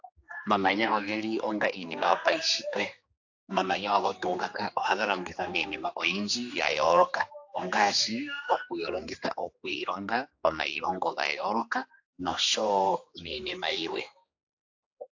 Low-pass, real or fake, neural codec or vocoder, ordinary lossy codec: 7.2 kHz; fake; codec, 16 kHz, 2 kbps, X-Codec, HuBERT features, trained on general audio; AAC, 48 kbps